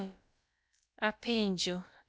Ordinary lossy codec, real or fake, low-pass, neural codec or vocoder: none; fake; none; codec, 16 kHz, about 1 kbps, DyCAST, with the encoder's durations